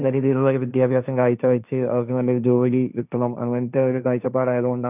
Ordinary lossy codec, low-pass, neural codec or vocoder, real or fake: none; 3.6 kHz; codec, 16 kHz, 1.1 kbps, Voila-Tokenizer; fake